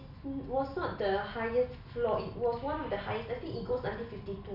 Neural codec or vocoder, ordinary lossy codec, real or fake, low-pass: none; none; real; 5.4 kHz